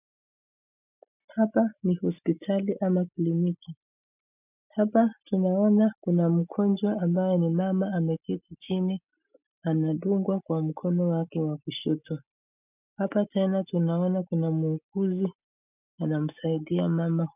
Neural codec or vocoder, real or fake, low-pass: none; real; 3.6 kHz